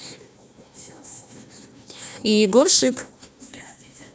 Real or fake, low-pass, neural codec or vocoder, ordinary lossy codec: fake; none; codec, 16 kHz, 1 kbps, FunCodec, trained on Chinese and English, 50 frames a second; none